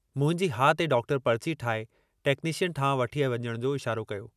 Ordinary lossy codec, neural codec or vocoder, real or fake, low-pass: none; none; real; 14.4 kHz